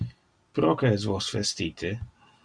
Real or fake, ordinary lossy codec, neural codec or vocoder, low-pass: real; Opus, 64 kbps; none; 9.9 kHz